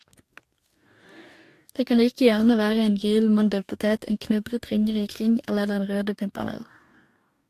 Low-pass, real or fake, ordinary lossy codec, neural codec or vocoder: 14.4 kHz; fake; AAC, 64 kbps; codec, 44.1 kHz, 2.6 kbps, DAC